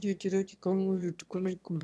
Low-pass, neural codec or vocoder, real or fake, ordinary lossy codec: none; autoencoder, 22.05 kHz, a latent of 192 numbers a frame, VITS, trained on one speaker; fake; none